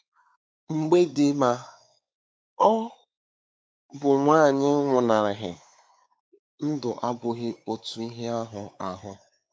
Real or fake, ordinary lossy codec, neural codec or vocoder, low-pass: fake; none; codec, 16 kHz, 4 kbps, X-Codec, WavLM features, trained on Multilingual LibriSpeech; none